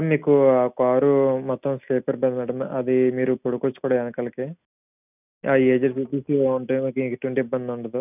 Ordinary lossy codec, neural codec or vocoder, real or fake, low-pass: none; none; real; 3.6 kHz